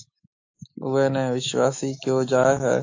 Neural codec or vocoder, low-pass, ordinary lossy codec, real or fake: autoencoder, 48 kHz, 128 numbers a frame, DAC-VAE, trained on Japanese speech; 7.2 kHz; AAC, 48 kbps; fake